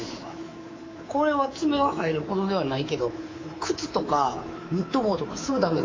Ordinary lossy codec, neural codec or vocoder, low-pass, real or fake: AAC, 32 kbps; codec, 24 kHz, 3.1 kbps, DualCodec; 7.2 kHz; fake